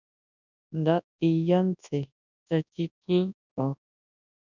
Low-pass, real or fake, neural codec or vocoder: 7.2 kHz; fake; codec, 24 kHz, 0.9 kbps, WavTokenizer, large speech release